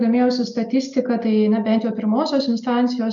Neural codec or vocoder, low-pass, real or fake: none; 7.2 kHz; real